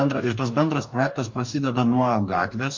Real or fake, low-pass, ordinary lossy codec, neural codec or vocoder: fake; 7.2 kHz; MP3, 48 kbps; codec, 44.1 kHz, 2.6 kbps, DAC